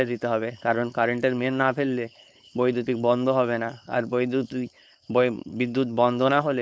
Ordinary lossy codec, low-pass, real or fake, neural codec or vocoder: none; none; fake; codec, 16 kHz, 4.8 kbps, FACodec